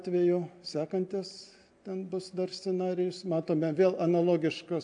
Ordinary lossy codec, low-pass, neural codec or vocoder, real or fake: AAC, 64 kbps; 9.9 kHz; none; real